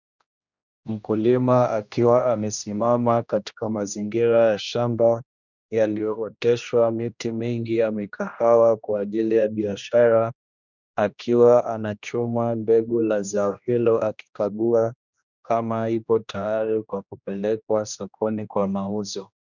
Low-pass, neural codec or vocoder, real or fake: 7.2 kHz; codec, 16 kHz, 1 kbps, X-Codec, HuBERT features, trained on general audio; fake